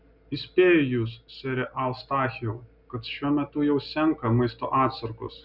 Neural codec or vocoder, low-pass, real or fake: none; 5.4 kHz; real